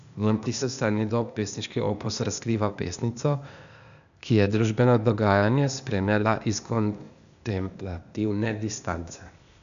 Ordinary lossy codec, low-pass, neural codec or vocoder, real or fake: none; 7.2 kHz; codec, 16 kHz, 0.8 kbps, ZipCodec; fake